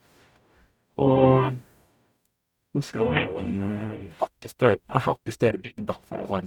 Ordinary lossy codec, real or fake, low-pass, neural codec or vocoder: none; fake; 19.8 kHz; codec, 44.1 kHz, 0.9 kbps, DAC